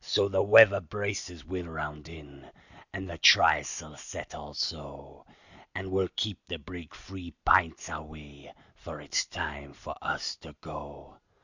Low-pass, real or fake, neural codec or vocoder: 7.2 kHz; real; none